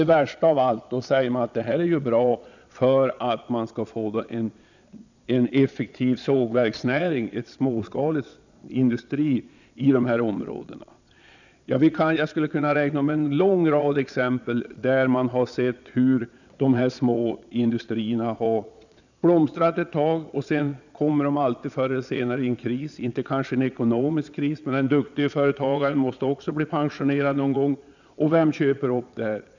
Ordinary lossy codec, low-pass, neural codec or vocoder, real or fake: none; 7.2 kHz; vocoder, 22.05 kHz, 80 mel bands, Vocos; fake